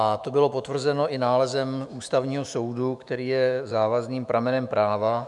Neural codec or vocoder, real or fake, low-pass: autoencoder, 48 kHz, 128 numbers a frame, DAC-VAE, trained on Japanese speech; fake; 10.8 kHz